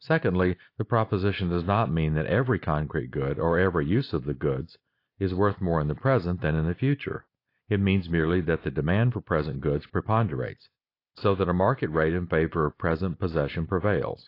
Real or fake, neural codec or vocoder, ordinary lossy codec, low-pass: real; none; AAC, 32 kbps; 5.4 kHz